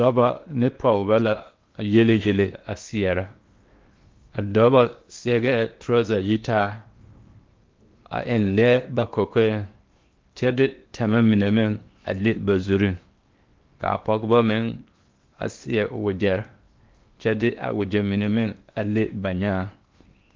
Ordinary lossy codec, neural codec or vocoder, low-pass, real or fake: Opus, 32 kbps; codec, 16 kHz in and 24 kHz out, 0.8 kbps, FocalCodec, streaming, 65536 codes; 7.2 kHz; fake